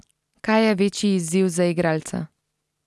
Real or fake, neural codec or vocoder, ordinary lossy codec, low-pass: real; none; none; none